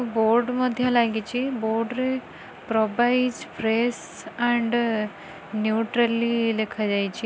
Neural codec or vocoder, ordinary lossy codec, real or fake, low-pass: none; none; real; none